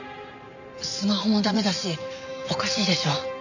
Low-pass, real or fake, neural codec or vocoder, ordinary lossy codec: 7.2 kHz; fake; vocoder, 44.1 kHz, 128 mel bands every 512 samples, BigVGAN v2; none